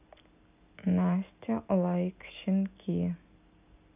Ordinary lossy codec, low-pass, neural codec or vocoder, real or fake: none; 3.6 kHz; none; real